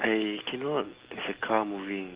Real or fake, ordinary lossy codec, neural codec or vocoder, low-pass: real; Opus, 16 kbps; none; 3.6 kHz